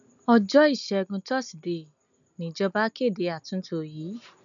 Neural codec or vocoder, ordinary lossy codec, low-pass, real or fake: none; none; 7.2 kHz; real